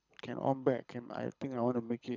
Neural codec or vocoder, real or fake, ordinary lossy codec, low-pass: codec, 24 kHz, 6 kbps, HILCodec; fake; none; 7.2 kHz